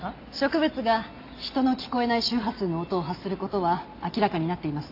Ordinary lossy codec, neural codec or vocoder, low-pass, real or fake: AAC, 32 kbps; none; 5.4 kHz; real